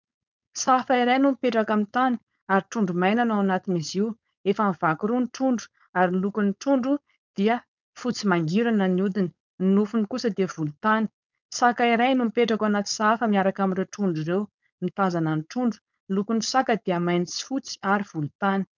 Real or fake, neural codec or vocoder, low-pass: fake; codec, 16 kHz, 4.8 kbps, FACodec; 7.2 kHz